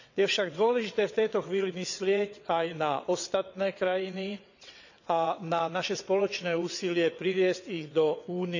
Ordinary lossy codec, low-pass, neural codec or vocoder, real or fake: none; 7.2 kHz; vocoder, 22.05 kHz, 80 mel bands, WaveNeXt; fake